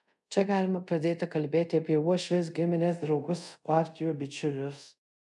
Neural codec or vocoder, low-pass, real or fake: codec, 24 kHz, 0.5 kbps, DualCodec; 10.8 kHz; fake